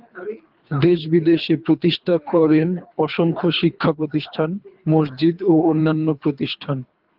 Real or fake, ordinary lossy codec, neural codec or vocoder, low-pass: fake; Opus, 32 kbps; codec, 24 kHz, 3 kbps, HILCodec; 5.4 kHz